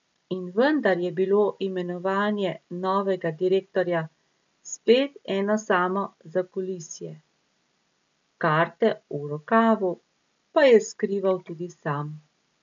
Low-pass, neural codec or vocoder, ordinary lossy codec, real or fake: 7.2 kHz; none; none; real